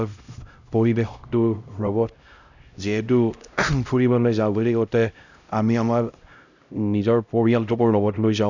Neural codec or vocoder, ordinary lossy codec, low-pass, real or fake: codec, 16 kHz, 0.5 kbps, X-Codec, HuBERT features, trained on LibriSpeech; none; 7.2 kHz; fake